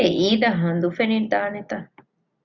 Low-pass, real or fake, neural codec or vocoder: 7.2 kHz; real; none